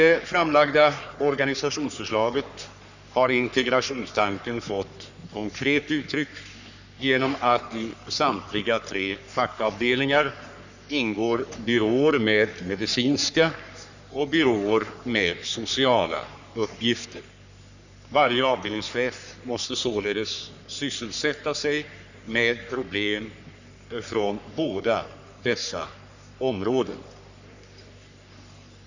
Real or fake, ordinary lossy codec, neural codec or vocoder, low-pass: fake; none; codec, 44.1 kHz, 3.4 kbps, Pupu-Codec; 7.2 kHz